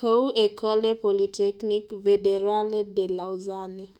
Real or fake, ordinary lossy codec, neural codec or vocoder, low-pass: fake; none; autoencoder, 48 kHz, 32 numbers a frame, DAC-VAE, trained on Japanese speech; 19.8 kHz